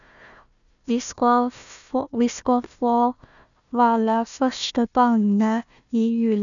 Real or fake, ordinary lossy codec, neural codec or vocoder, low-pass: fake; none; codec, 16 kHz, 1 kbps, FunCodec, trained on Chinese and English, 50 frames a second; 7.2 kHz